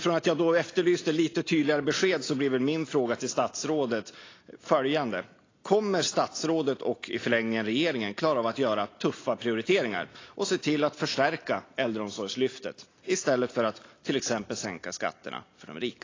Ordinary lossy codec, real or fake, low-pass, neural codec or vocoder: AAC, 32 kbps; real; 7.2 kHz; none